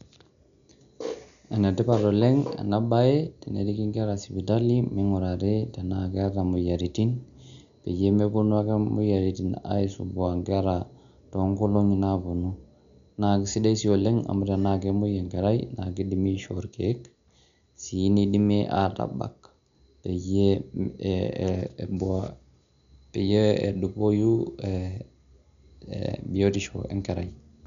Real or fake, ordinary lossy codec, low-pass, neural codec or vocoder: real; none; 7.2 kHz; none